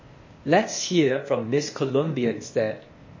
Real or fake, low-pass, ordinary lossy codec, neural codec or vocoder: fake; 7.2 kHz; MP3, 32 kbps; codec, 16 kHz, 0.8 kbps, ZipCodec